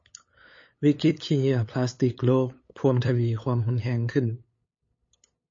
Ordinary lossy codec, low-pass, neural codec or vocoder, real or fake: MP3, 32 kbps; 7.2 kHz; codec, 16 kHz, 8 kbps, FunCodec, trained on LibriTTS, 25 frames a second; fake